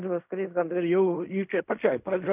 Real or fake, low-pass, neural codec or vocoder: fake; 3.6 kHz; codec, 16 kHz in and 24 kHz out, 0.4 kbps, LongCat-Audio-Codec, fine tuned four codebook decoder